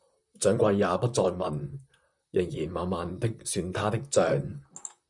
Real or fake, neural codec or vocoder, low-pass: fake; vocoder, 44.1 kHz, 128 mel bands, Pupu-Vocoder; 10.8 kHz